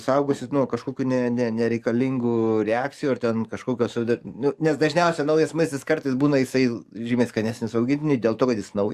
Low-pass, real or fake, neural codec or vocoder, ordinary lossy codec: 14.4 kHz; fake; codec, 44.1 kHz, 7.8 kbps, DAC; Opus, 64 kbps